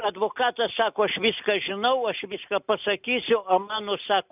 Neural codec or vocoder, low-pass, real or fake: none; 3.6 kHz; real